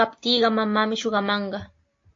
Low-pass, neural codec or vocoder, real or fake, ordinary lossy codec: 7.2 kHz; none; real; AAC, 48 kbps